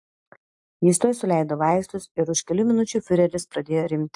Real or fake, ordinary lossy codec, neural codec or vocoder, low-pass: real; AAC, 64 kbps; none; 10.8 kHz